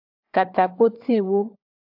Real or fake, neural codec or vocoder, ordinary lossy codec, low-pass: fake; codec, 16 kHz, 16 kbps, FunCodec, trained on LibriTTS, 50 frames a second; AAC, 48 kbps; 5.4 kHz